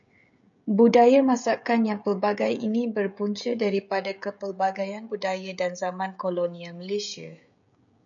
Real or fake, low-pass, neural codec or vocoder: fake; 7.2 kHz; codec, 16 kHz, 16 kbps, FreqCodec, smaller model